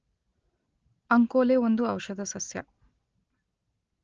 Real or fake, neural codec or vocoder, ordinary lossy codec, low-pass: real; none; Opus, 16 kbps; 7.2 kHz